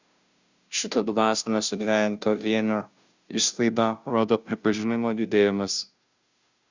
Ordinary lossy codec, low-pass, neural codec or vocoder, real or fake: Opus, 64 kbps; 7.2 kHz; codec, 16 kHz, 0.5 kbps, FunCodec, trained on Chinese and English, 25 frames a second; fake